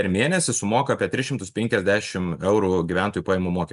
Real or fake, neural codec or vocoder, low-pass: real; none; 10.8 kHz